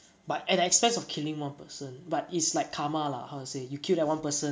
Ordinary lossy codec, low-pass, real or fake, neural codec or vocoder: none; none; real; none